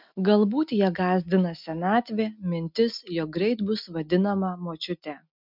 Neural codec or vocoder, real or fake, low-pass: none; real; 5.4 kHz